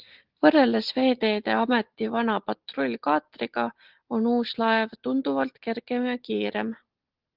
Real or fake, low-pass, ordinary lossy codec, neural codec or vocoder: real; 5.4 kHz; Opus, 16 kbps; none